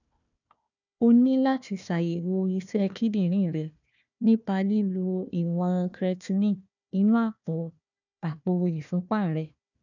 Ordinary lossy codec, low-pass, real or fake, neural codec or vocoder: none; 7.2 kHz; fake; codec, 16 kHz, 1 kbps, FunCodec, trained on Chinese and English, 50 frames a second